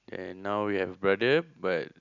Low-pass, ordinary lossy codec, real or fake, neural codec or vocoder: 7.2 kHz; none; real; none